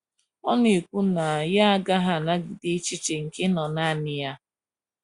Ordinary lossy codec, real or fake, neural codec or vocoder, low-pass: Opus, 64 kbps; real; none; 10.8 kHz